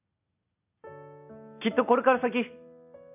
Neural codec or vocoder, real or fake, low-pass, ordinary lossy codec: none; real; 3.6 kHz; none